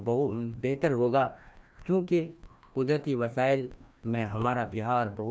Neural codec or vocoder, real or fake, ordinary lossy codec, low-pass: codec, 16 kHz, 1 kbps, FreqCodec, larger model; fake; none; none